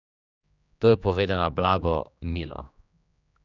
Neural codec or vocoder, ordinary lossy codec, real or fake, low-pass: codec, 16 kHz, 2 kbps, X-Codec, HuBERT features, trained on general audio; none; fake; 7.2 kHz